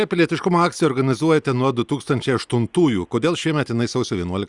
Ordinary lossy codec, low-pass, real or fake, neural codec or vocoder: Opus, 64 kbps; 10.8 kHz; real; none